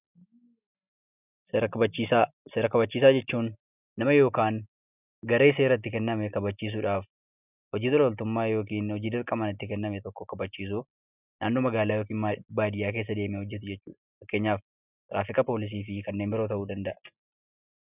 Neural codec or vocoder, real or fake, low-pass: none; real; 3.6 kHz